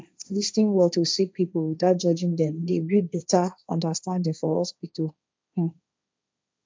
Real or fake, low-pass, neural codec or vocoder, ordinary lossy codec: fake; none; codec, 16 kHz, 1.1 kbps, Voila-Tokenizer; none